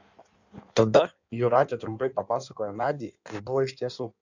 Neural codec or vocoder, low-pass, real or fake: codec, 16 kHz in and 24 kHz out, 1.1 kbps, FireRedTTS-2 codec; 7.2 kHz; fake